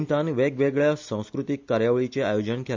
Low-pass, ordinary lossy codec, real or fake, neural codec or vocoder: 7.2 kHz; MP3, 64 kbps; real; none